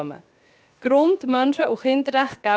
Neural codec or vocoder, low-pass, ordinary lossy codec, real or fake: codec, 16 kHz, 0.7 kbps, FocalCodec; none; none; fake